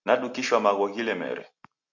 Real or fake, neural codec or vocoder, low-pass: real; none; 7.2 kHz